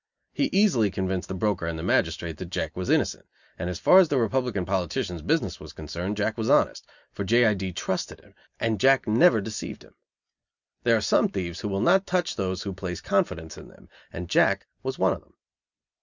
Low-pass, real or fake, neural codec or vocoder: 7.2 kHz; real; none